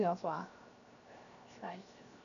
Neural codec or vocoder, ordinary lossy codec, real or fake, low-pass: codec, 16 kHz, 0.7 kbps, FocalCodec; none; fake; 7.2 kHz